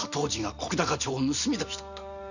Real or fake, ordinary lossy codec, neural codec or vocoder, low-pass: fake; none; vocoder, 44.1 kHz, 128 mel bands every 512 samples, BigVGAN v2; 7.2 kHz